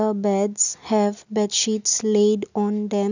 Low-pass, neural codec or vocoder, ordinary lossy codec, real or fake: 7.2 kHz; none; none; real